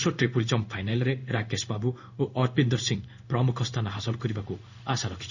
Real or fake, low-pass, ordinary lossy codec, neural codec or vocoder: fake; 7.2 kHz; none; codec, 16 kHz in and 24 kHz out, 1 kbps, XY-Tokenizer